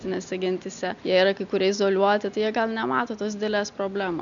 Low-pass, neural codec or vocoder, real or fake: 7.2 kHz; none; real